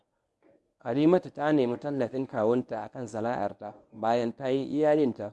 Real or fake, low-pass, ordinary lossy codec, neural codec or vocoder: fake; none; none; codec, 24 kHz, 0.9 kbps, WavTokenizer, medium speech release version 1